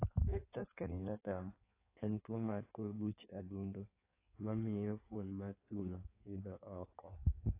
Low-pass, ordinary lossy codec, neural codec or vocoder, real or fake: 3.6 kHz; AAC, 24 kbps; codec, 16 kHz in and 24 kHz out, 1.1 kbps, FireRedTTS-2 codec; fake